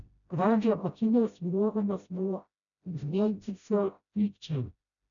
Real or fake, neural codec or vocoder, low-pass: fake; codec, 16 kHz, 0.5 kbps, FreqCodec, smaller model; 7.2 kHz